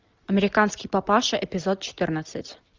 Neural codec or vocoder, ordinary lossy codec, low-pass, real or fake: none; Opus, 32 kbps; 7.2 kHz; real